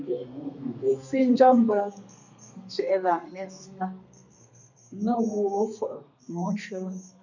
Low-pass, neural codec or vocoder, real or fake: 7.2 kHz; codec, 32 kHz, 1.9 kbps, SNAC; fake